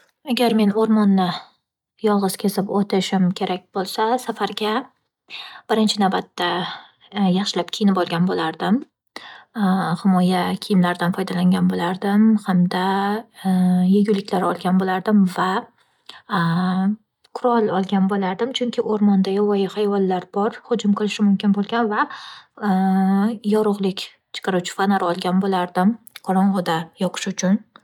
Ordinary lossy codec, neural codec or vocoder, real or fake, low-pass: none; none; real; 19.8 kHz